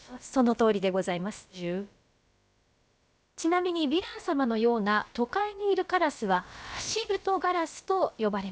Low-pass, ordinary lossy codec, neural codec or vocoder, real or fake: none; none; codec, 16 kHz, about 1 kbps, DyCAST, with the encoder's durations; fake